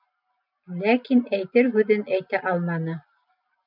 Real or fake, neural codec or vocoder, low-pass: real; none; 5.4 kHz